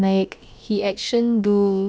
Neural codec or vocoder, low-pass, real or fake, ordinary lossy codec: codec, 16 kHz, about 1 kbps, DyCAST, with the encoder's durations; none; fake; none